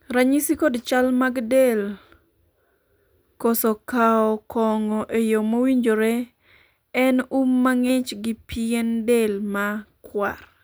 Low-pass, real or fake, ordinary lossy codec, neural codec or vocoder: none; real; none; none